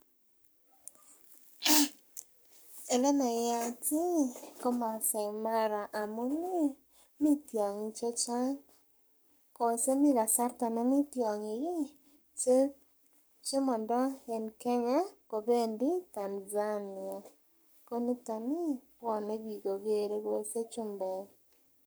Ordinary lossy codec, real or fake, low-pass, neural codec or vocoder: none; fake; none; codec, 44.1 kHz, 7.8 kbps, Pupu-Codec